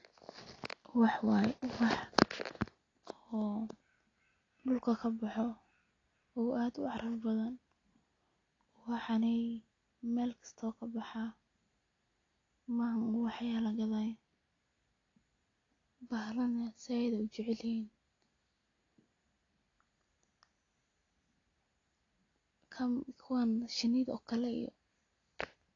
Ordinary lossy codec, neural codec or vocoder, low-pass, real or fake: AAC, 32 kbps; none; 7.2 kHz; real